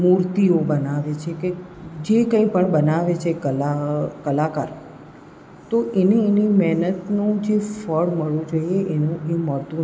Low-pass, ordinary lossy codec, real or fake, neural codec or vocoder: none; none; real; none